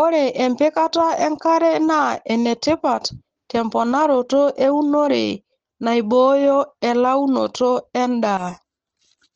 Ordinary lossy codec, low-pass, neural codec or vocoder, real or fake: Opus, 16 kbps; 7.2 kHz; none; real